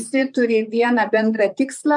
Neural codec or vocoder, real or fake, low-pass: vocoder, 44.1 kHz, 128 mel bands, Pupu-Vocoder; fake; 10.8 kHz